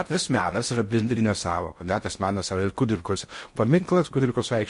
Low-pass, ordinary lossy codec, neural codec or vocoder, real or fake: 10.8 kHz; MP3, 48 kbps; codec, 16 kHz in and 24 kHz out, 0.6 kbps, FocalCodec, streaming, 4096 codes; fake